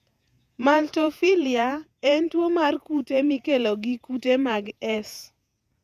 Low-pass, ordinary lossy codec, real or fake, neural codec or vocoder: 14.4 kHz; none; fake; vocoder, 48 kHz, 128 mel bands, Vocos